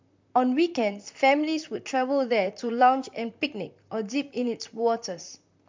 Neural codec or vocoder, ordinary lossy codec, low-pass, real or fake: vocoder, 44.1 kHz, 128 mel bands, Pupu-Vocoder; MP3, 64 kbps; 7.2 kHz; fake